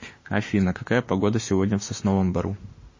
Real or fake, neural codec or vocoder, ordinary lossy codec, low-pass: fake; autoencoder, 48 kHz, 32 numbers a frame, DAC-VAE, trained on Japanese speech; MP3, 32 kbps; 7.2 kHz